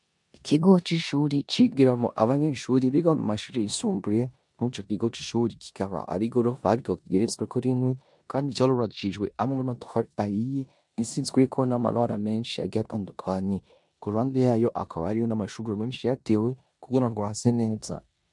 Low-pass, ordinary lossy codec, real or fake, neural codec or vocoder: 10.8 kHz; MP3, 64 kbps; fake; codec, 16 kHz in and 24 kHz out, 0.9 kbps, LongCat-Audio-Codec, four codebook decoder